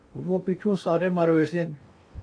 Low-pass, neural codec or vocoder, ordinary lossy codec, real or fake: 9.9 kHz; codec, 16 kHz in and 24 kHz out, 0.6 kbps, FocalCodec, streaming, 2048 codes; AAC, 48 kbps; fake